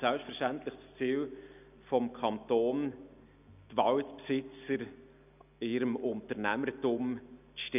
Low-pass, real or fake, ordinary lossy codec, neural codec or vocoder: 3.6 kHz; real; none; none